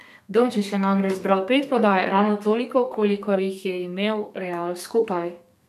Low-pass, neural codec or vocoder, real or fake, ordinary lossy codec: 14.4 kHz; codec, 32 kHz, 1.9 kbps, SNAC; fake; none